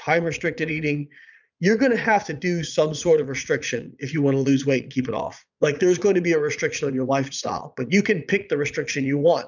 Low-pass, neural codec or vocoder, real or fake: 7.2 kHz; vocoder, 22.05 kHz, 80 mel bands, Vocos; fake